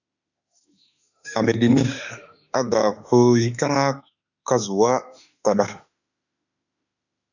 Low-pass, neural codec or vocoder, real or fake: 7.2 kHz; autoencoder, 48 kHz, 32 numbers a frame, DAC-VAE, trained on Japanese speech; fake